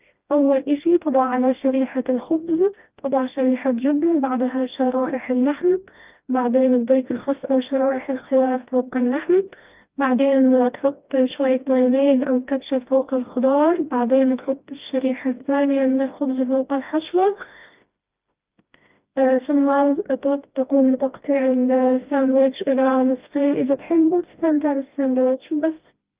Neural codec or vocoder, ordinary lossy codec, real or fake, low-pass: codec, 16 kHz, 1 kbps, FreqCodec, smaller model; Opus, 24 kbps; fake; 3.6 kHz